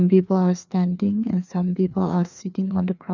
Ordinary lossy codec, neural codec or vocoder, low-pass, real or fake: none; codec, 16 kHz, 2 kbps, FreqCodec, larger model; 7.2 kHz; fake